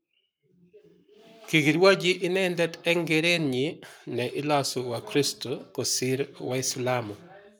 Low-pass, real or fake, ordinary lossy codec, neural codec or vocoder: none; fake; none; codec, 44.1 kHz, 7.8 kbps, Pupu-Codec